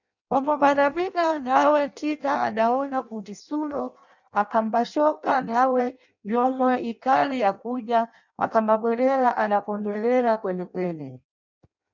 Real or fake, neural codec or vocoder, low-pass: fake; codec, 16 kHz in and 24 kHz out, 0.6 kbps, FireRedTTS-2 codec; 7.2 kHz